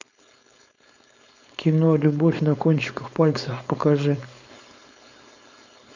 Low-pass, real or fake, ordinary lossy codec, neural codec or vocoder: 7.2 kHz; fake; MP3, 64 kbps; codec, 16 kHz, 4.8 kbps, FACodec